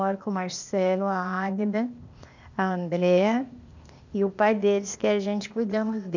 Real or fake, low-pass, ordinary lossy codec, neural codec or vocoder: fake; 7.2 kHz; none; codec, 16 kHz, 0.8 kbps, ZipCodec